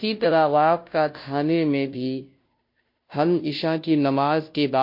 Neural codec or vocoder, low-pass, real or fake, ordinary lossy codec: codec, 16 kHz, 0.5 kbps, FunCodec, trained on Chinese and English, 25 frames a second; 5.4 kHz; fake; MP3, 32 kbps